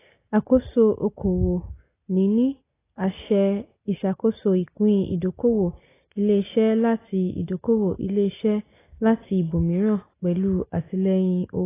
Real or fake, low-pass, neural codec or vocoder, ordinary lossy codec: real; 3.6 kHz; none; AAC, 16 kbps